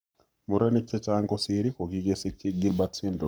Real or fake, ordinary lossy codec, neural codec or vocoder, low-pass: fake; none; codec, 44.1 kHz, 7.8 kbps, Pupu-Codec; none